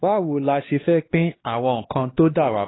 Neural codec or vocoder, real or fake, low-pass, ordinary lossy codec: codec, 16 kHz, 2 kbps, X-Codec, HuBERT features, trained on LibriSpeech; fake; 7.2 kHz; AAC, 16 kbps